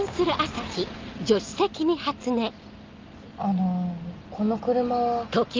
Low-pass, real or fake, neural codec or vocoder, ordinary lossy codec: 7.2 kHz; real; none; Opus, 24 kbps